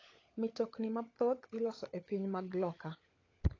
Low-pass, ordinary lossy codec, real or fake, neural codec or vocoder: 7.2 kHz; AAC, 32 kbps; fake; codec, 16 kHz, 16 kbps, FunCodec, trained on LibriTTS, 50 frames a second